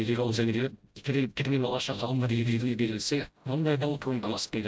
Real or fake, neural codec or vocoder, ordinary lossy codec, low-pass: fake; codec, 16 kHz, 0.5 kbps, FreqCodec, smaller model; none; none